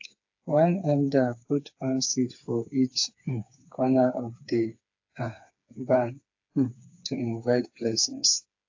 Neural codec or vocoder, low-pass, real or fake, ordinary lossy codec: codec, 16 kHz, 4 kbps, FreqCodec, smaller model; 7.2 kHz; fake; AAC, 48 kbps